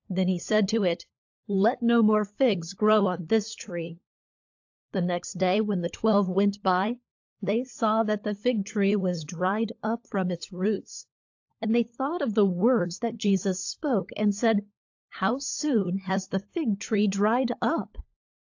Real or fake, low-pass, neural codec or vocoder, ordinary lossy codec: fake; 7.2 kHz; codec, 16 kHz, 16 kbps, FunCodec, trained on LibriTTS, 50 frames a second; AAC, 48 kbps